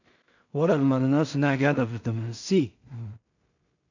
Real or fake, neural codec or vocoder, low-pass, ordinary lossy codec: fake; codec, 16 kHz in and 24 kHz out, 0.4 kbps, LongCat-Audio-Codec, two codebook decoder; 7.2 kHz; none